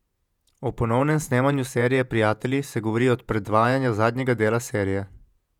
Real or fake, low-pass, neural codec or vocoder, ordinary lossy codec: fake; 19.8 kHz; vocoder, 48 kHz, 128 mel bands, Vocos; none